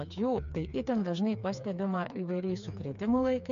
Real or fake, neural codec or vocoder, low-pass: fake; codec, 16 kHz, 4 kbps, FreqCodec, smaller model; 7.2 kHz